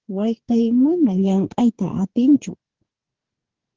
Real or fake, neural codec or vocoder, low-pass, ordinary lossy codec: fake; codec, 44.1 kHz, 2.6 kbps, DAC; 7.2 kHz; Opus, 16 kbps